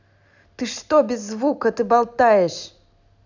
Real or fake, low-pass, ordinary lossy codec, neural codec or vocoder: real; 7.2 kHz; none; none